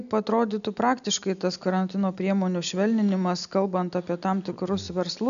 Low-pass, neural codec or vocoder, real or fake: 7.2 kHz; none; real